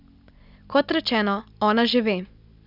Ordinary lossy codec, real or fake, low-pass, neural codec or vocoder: none; real; 5.4 kHz; none